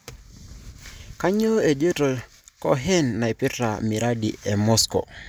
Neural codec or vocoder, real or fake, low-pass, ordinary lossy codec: none; real; none; none